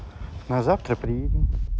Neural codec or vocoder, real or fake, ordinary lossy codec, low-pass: none; real; none; none